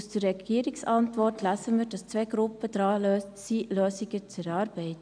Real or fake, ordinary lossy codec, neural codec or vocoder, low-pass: real; none; none; 9.9 kHz